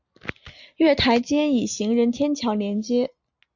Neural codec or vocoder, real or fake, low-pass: none; real; 7.2 kHz